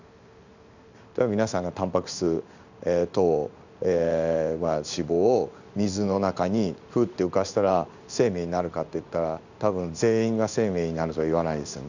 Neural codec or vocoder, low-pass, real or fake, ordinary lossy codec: codec, 16 kHz in and 24 kHz out, 1 kbps, XY-Tokenizer; 7.2 kHz; fake; none